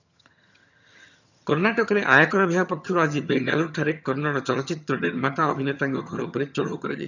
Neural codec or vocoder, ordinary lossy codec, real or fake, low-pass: vocoder, 22.05 kHz, 80 mel bands, HiFi-GAN; none; fake; 7.2 kHz